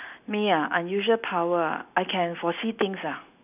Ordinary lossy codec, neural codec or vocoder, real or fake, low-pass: none; none; real; 3.6 kHz